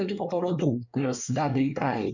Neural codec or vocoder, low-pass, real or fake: codec, 24 kHz, 1 kbps, SNAC; 7.2 kHz; fake